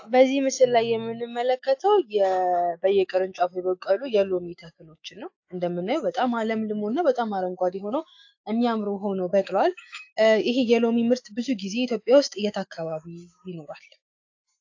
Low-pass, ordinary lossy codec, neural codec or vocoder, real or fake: 7.2 kHz; AAC, 48 kbps; autoencoder, 48 kHz, 128 numbers a frame, DAC-VAE, trained on Japanese speech; fake